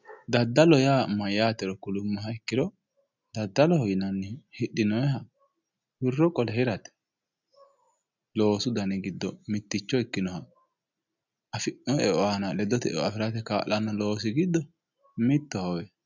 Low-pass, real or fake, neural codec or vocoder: 7.2 kHz; real; none